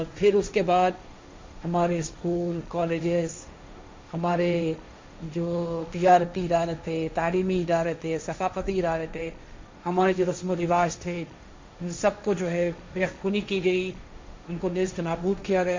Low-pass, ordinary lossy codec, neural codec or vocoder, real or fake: 7.2 kHz; MP3, 64 kbps; codec, 16 kHz, 1.1 kbps, Voila-Tokenizer; fake